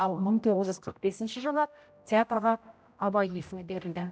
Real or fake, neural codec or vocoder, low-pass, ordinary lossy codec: fake; codec, 16 kHz, 0.5 kbps, X-Codec, HuBERT features, trained on general audio; none; none